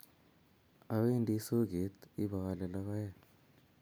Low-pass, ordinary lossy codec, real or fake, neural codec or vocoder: none; none; real; none